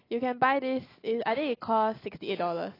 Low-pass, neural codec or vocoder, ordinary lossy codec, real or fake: 5.4 kHz; none; AAC, 24 kbps; real